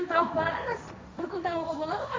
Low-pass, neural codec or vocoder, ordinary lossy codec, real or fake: none; codec, 16 kHz, 1.1 kbps, Voila-Tokenizer; none; fake